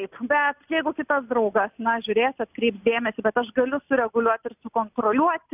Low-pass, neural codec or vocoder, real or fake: 3.6 kHz; none; real